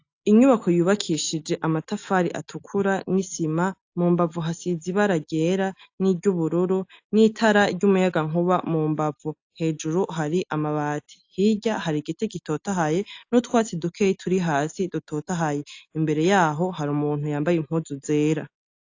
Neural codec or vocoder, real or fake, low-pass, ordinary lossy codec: none; real; 7.2 kHz; AAC, 48 kbps